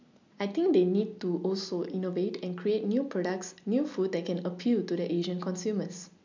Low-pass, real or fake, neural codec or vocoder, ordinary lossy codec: 7.2 kHz; real; none; MP3, 64 kbps